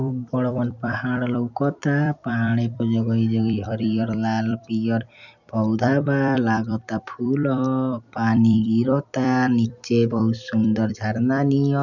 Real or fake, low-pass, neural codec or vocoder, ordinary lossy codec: fake; 7.2 kHz; vocoder, 44.1 kHz, 128 mel bands every 256 samples, BigVGAN v2; none